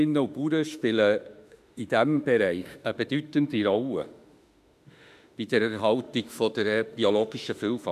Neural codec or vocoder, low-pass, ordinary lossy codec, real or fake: autoencoder, 48 kHz, 32 numbers a frame, DAC-VAE, trained on Japanese speech; 14.4 kHz; none; fake